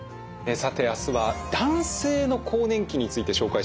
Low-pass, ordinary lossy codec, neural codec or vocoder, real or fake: none; none; none; real